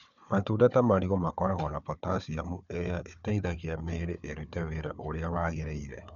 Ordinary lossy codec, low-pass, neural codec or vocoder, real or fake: none; 7.2 kHz; codec, 16 kHz, 4 kbps, FunCodec, trained on Chinese and English, 50 frames a second; fake